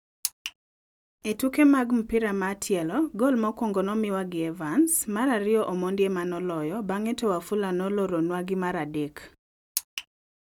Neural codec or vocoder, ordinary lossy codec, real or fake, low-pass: none; none; real; 19.8 kHz